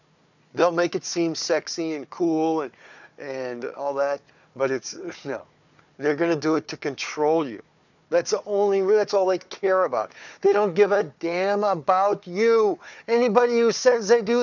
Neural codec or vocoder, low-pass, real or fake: codec, 16 kHz, 4 kbps, FunCodec, trained on Chinese and English, 50 frames a second; 7.2 kHz; fake